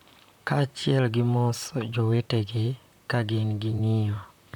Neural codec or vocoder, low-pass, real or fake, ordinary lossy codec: vocoder, 44.1 kHz, 128 mel bands, Pupu-Vocoder; 19.8 kHz; fake; none